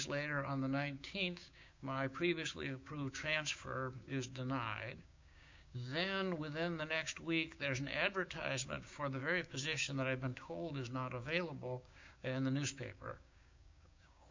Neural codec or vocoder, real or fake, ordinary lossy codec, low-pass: codec, 16 kHz, 6 kbps, DAC; fake; MP3, 64 kbps; 7.2 kHz